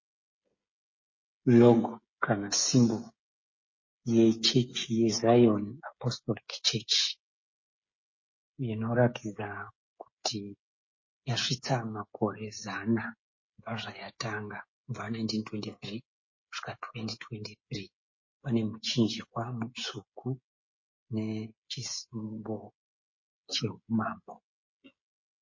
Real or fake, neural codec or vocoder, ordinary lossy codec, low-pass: fake; codec, 16 kHz, 16 kbps, FreqCodec, smaller model; MP3, 32 kbps; 7.2 kHz